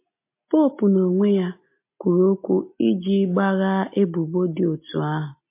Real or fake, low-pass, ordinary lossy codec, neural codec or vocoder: real; 3.6 kHz; MP3, 24 kbps; none